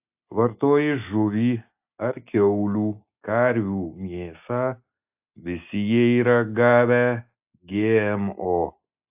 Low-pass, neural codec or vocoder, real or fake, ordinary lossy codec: 3.6 kHz; none; real; AAC, 32 kbps